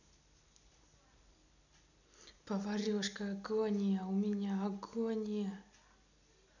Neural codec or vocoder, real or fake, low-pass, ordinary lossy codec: none; real; 7.2 kHz; none